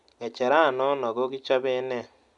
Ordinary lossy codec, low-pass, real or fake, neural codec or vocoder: none; 10.8 kHz; real; none